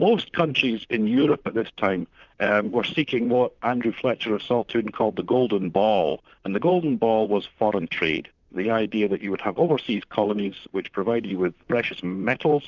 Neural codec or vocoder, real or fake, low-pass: vocoder, 44.1 kHz, 128 mel bands, Pupu-Vocoder; fake; 7.2 kHz